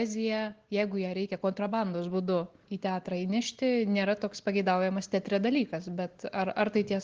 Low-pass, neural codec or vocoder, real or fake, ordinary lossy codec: 7.2 kHz; none; real; Opus, 16 kbps